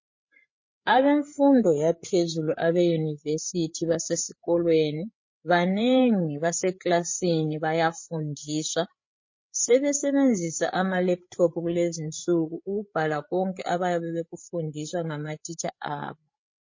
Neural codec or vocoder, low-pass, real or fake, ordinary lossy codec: codec, 16 kHz, 8 kbps, FreqCodec, larger model; 7.2 kHz; fake; MP3, 32 kbps